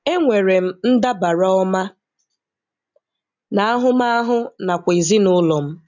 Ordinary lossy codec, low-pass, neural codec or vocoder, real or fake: none; 7.2 kHz; none; real